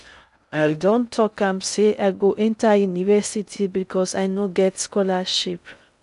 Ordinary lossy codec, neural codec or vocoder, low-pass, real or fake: none; codec, 16 kHz in and 24 kHz out, 0.6 kbps, FocalCodec, streaming, 2048 codes; 10.8 kHz; fake